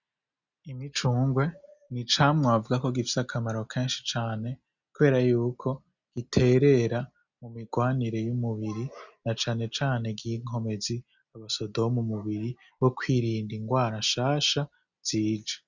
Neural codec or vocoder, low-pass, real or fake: none; 7.2 kHz; real